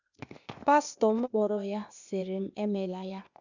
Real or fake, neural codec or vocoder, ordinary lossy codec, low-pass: fake; codec, 16 kHz, 0.8 kbps, ZipCodec; none; 7.2 kHz